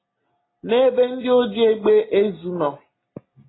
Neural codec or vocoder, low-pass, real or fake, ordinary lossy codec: none; 7.2 kHz; real; AAC, 16 kbps